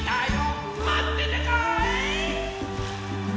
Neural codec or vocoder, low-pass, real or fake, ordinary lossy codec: none; none; real; none